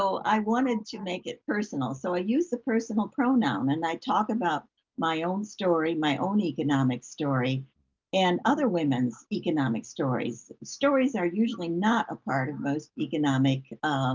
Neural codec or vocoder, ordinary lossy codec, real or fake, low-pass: none; Opus, 24 kbps; real; 7.2 kHz